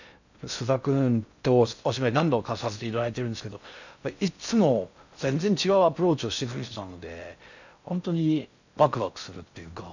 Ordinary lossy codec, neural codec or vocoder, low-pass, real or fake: none; codec, 16 kHz in and 24 kHz out, 0.6 kbps, FocalCodec, streaming, 2048 codes; 7.2 kHz; fake